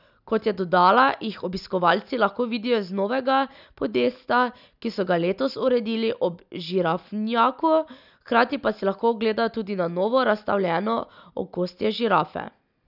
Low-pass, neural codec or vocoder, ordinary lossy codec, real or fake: 5.4 kHz; none; none; real